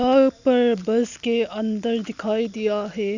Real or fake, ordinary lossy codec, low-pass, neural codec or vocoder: real; none; 7.2 kHz; none